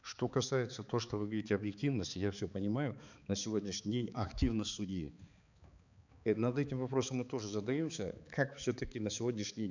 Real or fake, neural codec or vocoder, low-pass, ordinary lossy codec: fake; codec, 16 kHz, 4 kbps, X-Codec, HuBERT features, trained on balanced general audio; 7.2 kHz; none